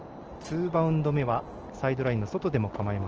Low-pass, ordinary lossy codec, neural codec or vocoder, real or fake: 7.2 kHz; Opus, 16 kbps; none; real